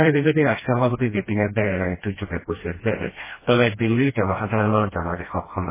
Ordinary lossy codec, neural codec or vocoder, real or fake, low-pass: MP3, 16 kbps; codec, 16 kHz, 1 kbps, FreqCodec, smaller model; fake; 3.6 kHz